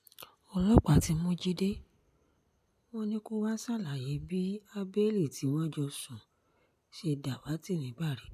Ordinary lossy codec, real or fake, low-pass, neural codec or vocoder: MP3, 96 kbps; real; 14.4 kHz; none